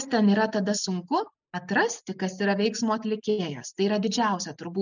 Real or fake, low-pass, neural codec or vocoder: real; 7.2 kHz; none